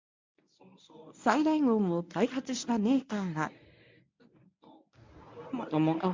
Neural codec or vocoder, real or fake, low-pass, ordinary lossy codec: codec, 24 kHz, 0.9 kbps, WavTokenizer, medium speech release version 1; fake; 7.2 kHz; MP3, 64 kbps